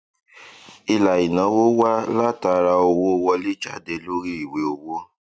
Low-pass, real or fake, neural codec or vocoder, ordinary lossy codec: none; real; none; none